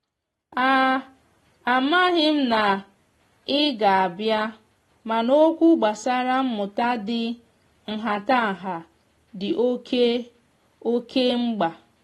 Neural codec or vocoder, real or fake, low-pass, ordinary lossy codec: none; real; 19.8 kHz; AAC, 32 kbps